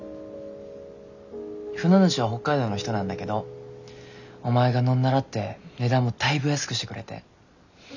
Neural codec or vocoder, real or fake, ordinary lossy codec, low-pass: none; real; none; 7.2 kHz